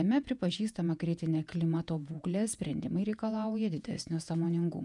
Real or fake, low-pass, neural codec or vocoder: fake; 10.8 kHz; vocoder, 48 kHz, 128 mel bands, Vocos